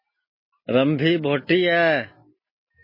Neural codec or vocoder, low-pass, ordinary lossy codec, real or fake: none; 5.4 kHz; MP3, 24 kbps; real